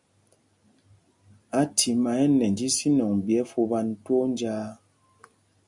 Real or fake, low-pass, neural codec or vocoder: real; 10.8 kHz; none